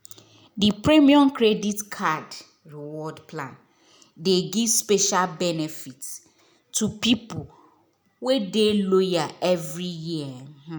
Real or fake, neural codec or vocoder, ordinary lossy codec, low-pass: real; none; none; none